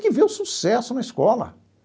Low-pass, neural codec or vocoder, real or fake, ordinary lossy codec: none; none; real; none